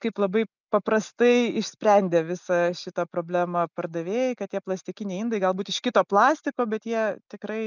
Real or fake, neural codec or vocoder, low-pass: real; none; 7.2 kHz